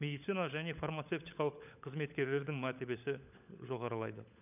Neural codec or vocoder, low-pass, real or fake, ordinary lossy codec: codec, 16 kHz, 16 kbps, FunCodec, trained on LibriTTS, 50 frames a second; 3.6 kHz; fake; none